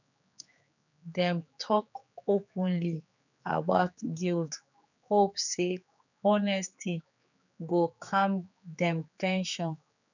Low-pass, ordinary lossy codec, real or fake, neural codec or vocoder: 7.2 kHz; none; fake; codec, 16 kHz, 4 kbps, X-Codec, HuBERT features, trained on general audio